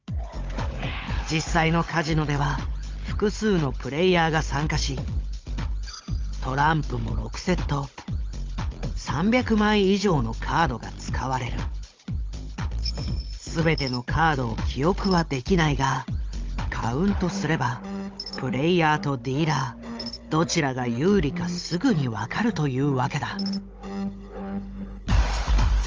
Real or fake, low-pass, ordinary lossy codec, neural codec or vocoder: fake; 7.2 kHz; Opus, 24 kbps; codec, 16 kHz, 16 kbps, FunCodec, trained on Chinese and English, 50 frames a second